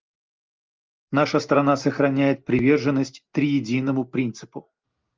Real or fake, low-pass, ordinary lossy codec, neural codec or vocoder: real; 7.2 kHz; Opus, 24 kbps; none